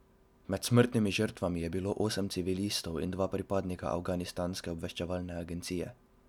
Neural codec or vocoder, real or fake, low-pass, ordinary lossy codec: none; real; 19.8 kHz; none